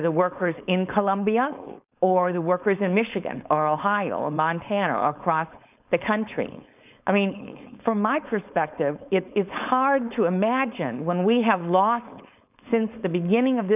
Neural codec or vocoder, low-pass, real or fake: codec, 16 kHz, 4.8 kbps, FACodec; 3.6 kHz; fake